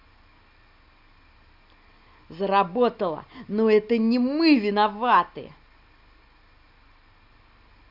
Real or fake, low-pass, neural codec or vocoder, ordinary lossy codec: real; 5.4 kHz; none; Opus, 64 kbps